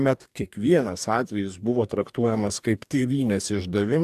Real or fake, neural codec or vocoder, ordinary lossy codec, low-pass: fake; codec, 44.1 kHz, 2.6 kbps, DAC; AAC, 96 kbps; 14.4 kHz